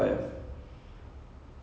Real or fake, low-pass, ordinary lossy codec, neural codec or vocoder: real; none; none; none